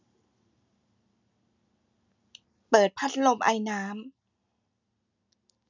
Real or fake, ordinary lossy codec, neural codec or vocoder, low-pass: real; none; none; 7.2 kHz